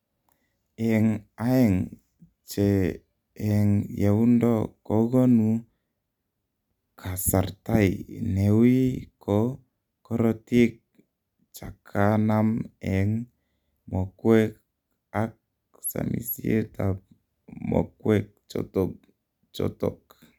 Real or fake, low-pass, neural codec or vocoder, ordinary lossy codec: real; 19.8 kHz; none; none